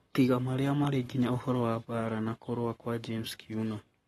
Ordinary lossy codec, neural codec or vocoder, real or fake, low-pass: AAC, 32 kbps; codec, 44.1 kHz, 7.8 kbps, Pupu-Codec; fake; 19.8 kHz